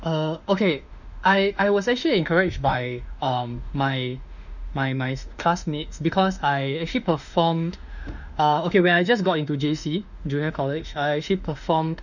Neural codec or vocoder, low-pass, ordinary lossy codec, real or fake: autoencoder, 48 kHz, 32 numbers a frame, DAC-VAE, trained on Japanese speech; 7.2 kHz; none; fake